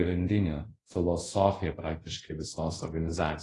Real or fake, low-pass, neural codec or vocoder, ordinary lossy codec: fake; 10.8 kHz; codec, 24 kHz, 0.5 kbps, DualCodec; AAC, 32 kbps